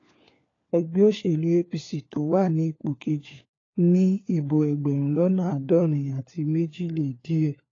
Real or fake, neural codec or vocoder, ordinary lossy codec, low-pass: fake; codec, 16 kHz, 4 kbps, FunCodec, trained on LibriTTS, 50 frames a second; AAC, 32 kbps; 7.2 kHz